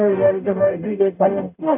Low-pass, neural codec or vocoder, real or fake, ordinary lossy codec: 3.6 kHz; codec, 44.1 kHz, 0.9 kbps, DAC; fake; AAC, 32 kbps